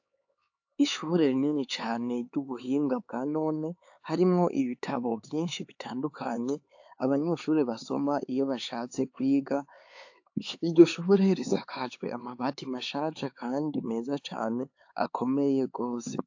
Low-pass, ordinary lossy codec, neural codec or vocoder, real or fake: 7.2 kHz; AAC, 48 kbps; codec, 16 kHz, 4 kbps, X-Codec, HuBERT features, trained on LibriSpeech; fake